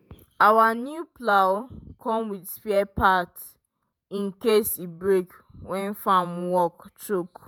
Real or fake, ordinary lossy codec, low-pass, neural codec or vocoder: fake; none; none; vocoder, 48 kHz, 128 mel bands, Vocos